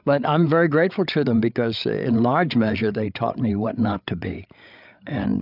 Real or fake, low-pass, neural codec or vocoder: fake; 5.4 kHz; codec, 16 kHz, 8 kbps, FreqCodec, larger model